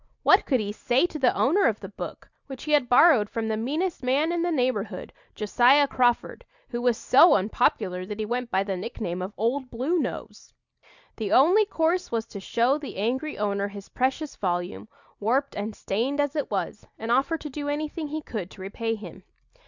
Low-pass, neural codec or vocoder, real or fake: 7.2 kHz; none; real